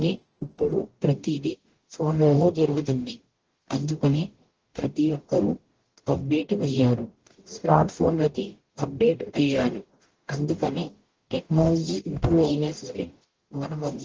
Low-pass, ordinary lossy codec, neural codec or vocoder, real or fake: 7.2 kHz; Opus, 16 kbps; codec, 44.1 kHz, 0.9 kbps, DAC; fake